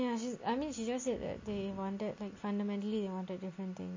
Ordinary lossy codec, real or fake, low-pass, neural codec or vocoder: MP3, 32 kbps; real; 7.2 kHz; none